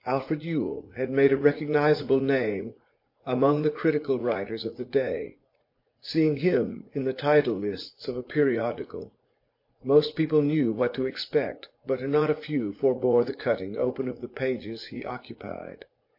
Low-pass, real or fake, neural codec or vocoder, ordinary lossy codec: 5.4 kHz; fake; vocoder, 44.1 kHz, 80 mel bands, Vocos; MP3, 32 kbps